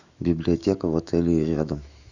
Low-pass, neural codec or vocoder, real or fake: 7.2 kHz; autoencoder, 48 kHz, 128 numbers a frame, DAC-VAE, trained on Japanese speech; fake